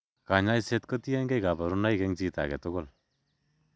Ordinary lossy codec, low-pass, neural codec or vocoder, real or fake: none; none; none; real